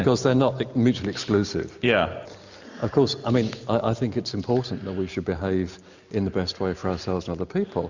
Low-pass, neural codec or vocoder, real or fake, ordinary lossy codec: 7.2 kHz; none; real; Opus, 64 kbps